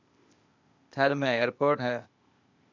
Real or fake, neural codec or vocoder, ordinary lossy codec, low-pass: fake; codec, 16 kHz, 0.8 kbps, ZipCodec; MP3, 64 kbps; 7.2 kHz